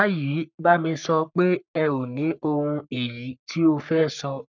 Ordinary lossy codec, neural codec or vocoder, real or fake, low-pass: none; codec, 44.1 kHz, 3.4 kbps, Pupu-Codec; fake; 7.2 kHz